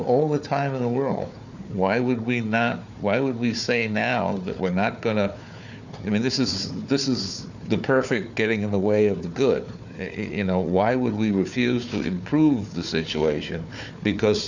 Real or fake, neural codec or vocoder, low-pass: fake; codec, 16 kHz, 4 kbps, FunCodec, trained on Chinese and English, 50 frames a second; 7.2 kHz